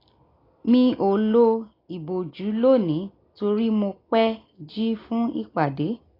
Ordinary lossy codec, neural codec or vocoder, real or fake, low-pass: AAC, 24 kbps; none; real; 5.4 kHz